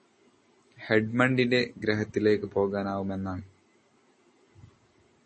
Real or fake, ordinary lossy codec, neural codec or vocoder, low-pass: real; MP3, 32 kbps; none; 10.8 kHz